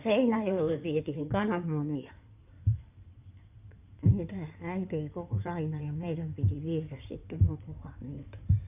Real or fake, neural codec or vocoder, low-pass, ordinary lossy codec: fake; codec, 16 kHz in and 24 kHz out, 2.2 kbps, FireRedTTS-2 codec; 3.6 kHz; none